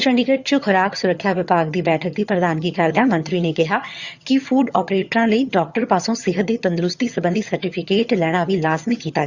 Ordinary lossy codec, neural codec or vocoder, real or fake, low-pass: Opus, 64 kbps; vocoder, 22.05 kHz, 80 mel bands, HiFi-GAN; fake; 7.2 kHz